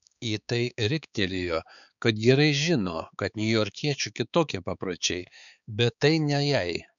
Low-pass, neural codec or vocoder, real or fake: 7.2 kHz; codec, 16 kHz, 4 kbps, X-Codec, HuBERT features, trained on balanced general audio; fake